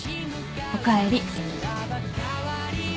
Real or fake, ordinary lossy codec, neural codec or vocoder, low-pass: real; none; none; none